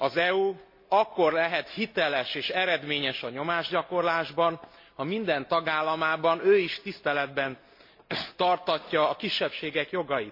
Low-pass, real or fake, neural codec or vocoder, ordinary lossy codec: 5.4 kHz; real; none; MP3, 32 kbps